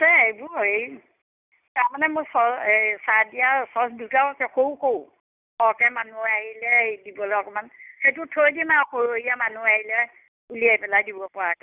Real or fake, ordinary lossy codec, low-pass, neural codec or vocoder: real; none; 3.6 kHz; none